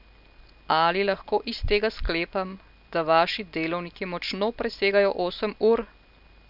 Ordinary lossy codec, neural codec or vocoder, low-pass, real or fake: none; none; 5.4 kHz; real